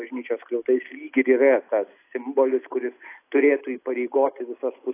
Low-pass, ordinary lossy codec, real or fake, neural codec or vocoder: 3.6 kHz; AAC, 24 kbps; real; none